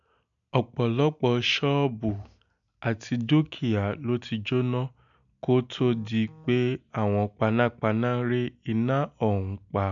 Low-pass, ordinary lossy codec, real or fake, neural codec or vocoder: 7.2 kHz; none; real; none